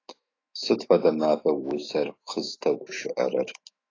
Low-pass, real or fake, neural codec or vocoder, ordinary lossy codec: 7.2 kHz; fake; autoencoder, 48 kHz, 128 numbers a frame, DAC-VAE, trained on Japanese speech; AAC, 32 kbps